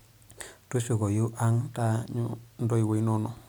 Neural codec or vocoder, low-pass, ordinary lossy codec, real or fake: none; none; none; real